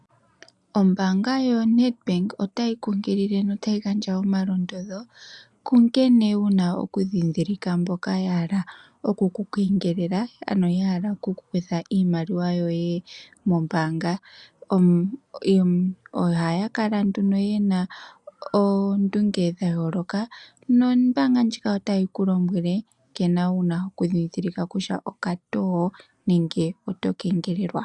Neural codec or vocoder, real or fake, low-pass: none; real; 10.8 kHz